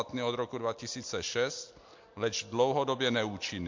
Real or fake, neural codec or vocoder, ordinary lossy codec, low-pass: real; none; MP3, 48 kbps; 7.2 kHz